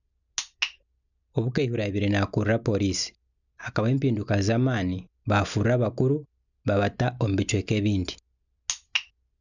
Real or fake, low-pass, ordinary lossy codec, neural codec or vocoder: real; 7.2 kHz; none; none